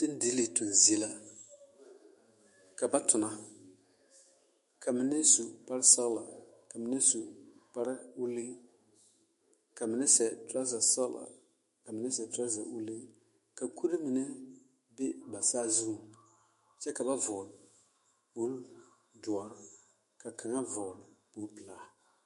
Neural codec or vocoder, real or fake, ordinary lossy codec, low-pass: autoencoder, 48 kHz, 128 numbers a frame, DAC-VAE, trained on Japanese speech; fake; MP3, 48 kbps; 14.4 kHz